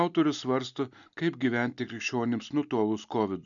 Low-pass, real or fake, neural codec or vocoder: 7.2 kHz; real; none